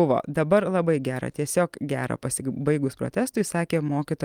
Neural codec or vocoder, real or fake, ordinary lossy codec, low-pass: none; real; Opus, 32 kbps; 19.8 kHz